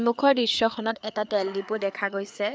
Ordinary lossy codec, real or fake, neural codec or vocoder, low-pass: none; fake; codec, 16 kHz, 4 kbps, FreqCodec, larger model; none